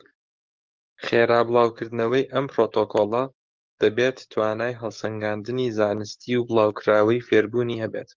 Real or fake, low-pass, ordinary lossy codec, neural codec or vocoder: fake; 7.2 kHz; Opus, 32 kbps; codec, 44.1 kHz, 7.8 kbps, DAC